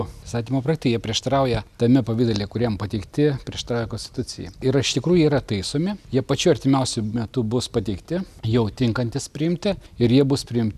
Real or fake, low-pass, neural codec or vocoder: real; 14.4 kHz; none